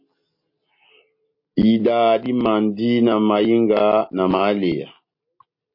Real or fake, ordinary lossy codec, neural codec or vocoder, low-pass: real; MP3, 32 kbps; none; 5.4 kHz